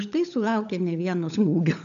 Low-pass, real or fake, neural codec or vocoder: 7.2 kHz; fake; codec, 16 kHz, 16 kbps, FunCodec, trained on LibriTTS, 50 frames a second